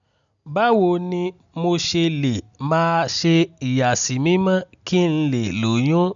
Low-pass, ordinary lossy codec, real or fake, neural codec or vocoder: 7.2 kHz; none; real; none